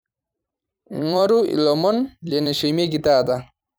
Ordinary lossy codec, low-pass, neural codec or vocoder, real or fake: none; none; vocoder, 44.1 kHz, 128 mel bands every 256 samples, BigVGAN v2; fake